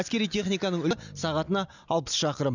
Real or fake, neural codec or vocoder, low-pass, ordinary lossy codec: real; none; 7.2 kHz; none